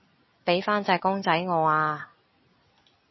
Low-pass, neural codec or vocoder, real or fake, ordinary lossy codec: 7.2 kHz; none; real; MP3, 24 kbps